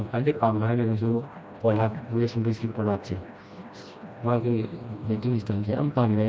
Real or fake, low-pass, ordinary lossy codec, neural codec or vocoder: fake; none; none; codec, 16 kHz, 1 kbps, FreqCodec, smaller model